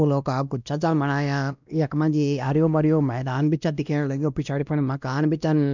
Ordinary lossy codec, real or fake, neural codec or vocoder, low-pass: none; fake; codec, 16 kHz, 1 kbps, X-Codec, WavLM features, trained on Multilingual LibriSpeech; 7.2 kHz